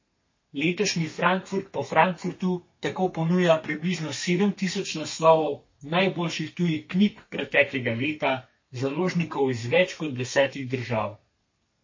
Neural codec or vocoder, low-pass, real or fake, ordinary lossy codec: codec, 32 kHz, 1.9 kbps, SNAC; 7.2 kHz; fake; MP3, 32 kbps